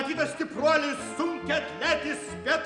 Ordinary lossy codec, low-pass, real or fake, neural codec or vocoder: Opus, 64 kbps; 10.8 kHz; real; none